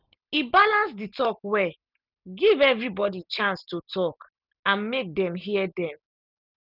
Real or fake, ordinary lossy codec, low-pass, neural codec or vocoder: real; none; 5.4 kHz; none